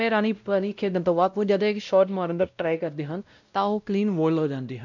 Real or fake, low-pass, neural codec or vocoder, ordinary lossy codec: fake; 7.2 kHz; codec, 16 kHz, 0.5 kbps, X-Codec, WavLM features, trained on Multilingual LibriSpeech; none